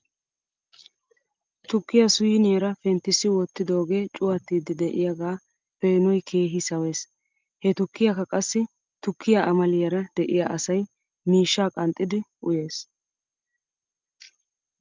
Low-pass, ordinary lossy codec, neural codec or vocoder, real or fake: 7.2 kHz; Opus, 24 kbps; none; real